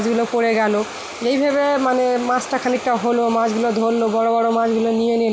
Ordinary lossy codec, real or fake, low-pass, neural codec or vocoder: none; real; none; none